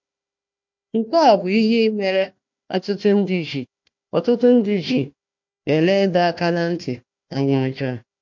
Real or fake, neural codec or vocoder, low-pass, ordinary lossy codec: fake; codec, 16 kHz, 1 kbps, FunCodec, trained on Chinese and English, 50 frames a second; 7.2 kHz; MP3, 48 kbps